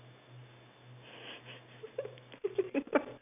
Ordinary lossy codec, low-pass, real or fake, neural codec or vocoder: none; 3.6 kHz; real; none